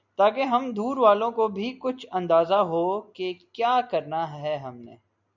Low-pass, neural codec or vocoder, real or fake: 7.2 kHz; none; real